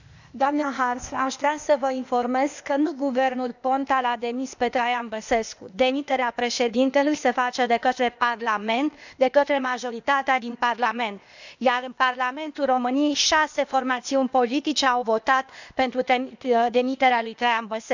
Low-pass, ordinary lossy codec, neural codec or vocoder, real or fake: 7.2 kHz; none; codec, 16 kHz, 0.8 kbps, ZipCodec; fake